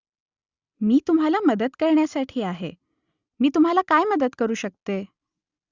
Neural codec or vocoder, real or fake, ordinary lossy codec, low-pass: none; real; Opus, 64 kbps; 7.2 kHz